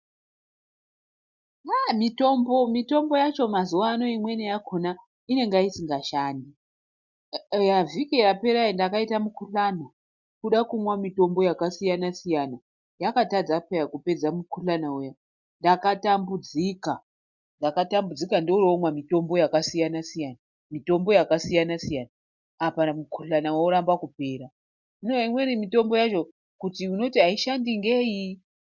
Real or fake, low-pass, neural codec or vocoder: real; 7.2 kHz; none